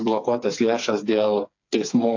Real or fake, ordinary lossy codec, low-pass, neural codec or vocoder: fake; AAC, 48 kbps; 7.2 kHz; codec, 16 kHz, 4 kbps, FreqCodec, smaller model